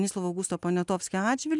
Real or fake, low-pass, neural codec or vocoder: real; 10.8 kHz; none